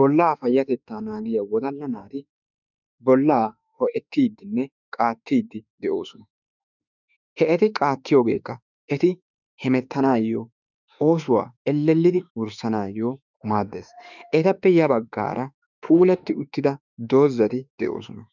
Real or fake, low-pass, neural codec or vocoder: fake; 7.2 kHz; autoencoder, 48 kHz, 32 numbers a frame, DAC-VAE, trained on Japanese speech